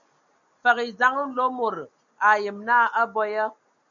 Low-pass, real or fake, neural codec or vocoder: 7.2 kHz; real; none